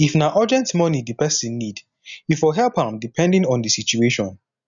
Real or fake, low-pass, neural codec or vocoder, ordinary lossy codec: real; 7.2 kHz; none; none